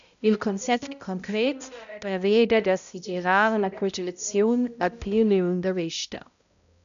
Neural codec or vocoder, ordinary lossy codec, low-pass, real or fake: codec, 16 kHz, 0.5 kbps, X-Codec, HuBERT features, trained on balanced general audio; MP3, 96 kbps; 7.2 kHz; fake